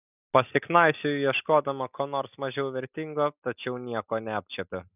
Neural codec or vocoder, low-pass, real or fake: none; 3.6 kHz; real